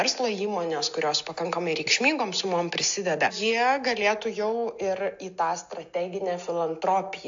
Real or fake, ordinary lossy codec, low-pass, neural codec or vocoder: real; AAC, 64 kbps; 7.2 kHz; none